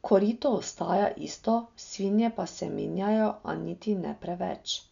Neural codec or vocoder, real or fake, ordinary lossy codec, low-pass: none; real; none; 7.2 kHz